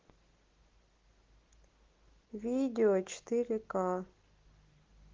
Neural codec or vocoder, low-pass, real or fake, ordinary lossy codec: none; 7.2 kHz; real; Opus, 16 kbps